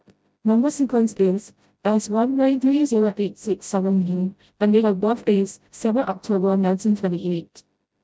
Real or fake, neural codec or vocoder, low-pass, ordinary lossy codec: fake; codec, 16 kHz, 0.5 kbps, FreqCodec, smaller model; none; none